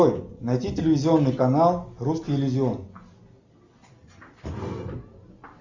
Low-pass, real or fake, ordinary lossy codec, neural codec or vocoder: 7.2 kHz; real; Opus, 64 kbps; none